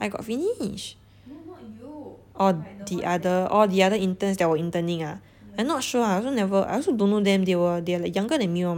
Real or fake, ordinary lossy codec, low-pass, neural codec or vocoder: real; none; 19.8 kHz; none